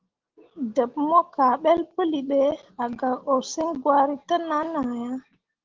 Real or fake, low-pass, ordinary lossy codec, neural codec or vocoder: real; 7.2 kHz; Opus, 16 kbps; none